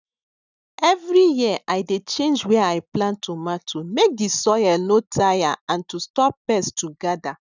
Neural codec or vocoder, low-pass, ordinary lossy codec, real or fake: none; 7.2 kHz; none; real